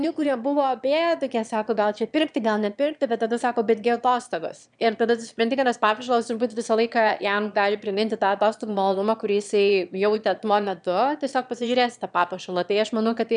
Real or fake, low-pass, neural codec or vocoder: fake; 9.9 kHz; autoencoder, 22.05 kHz, a latent of 192 numbers a frame, VITS, trained on one speaker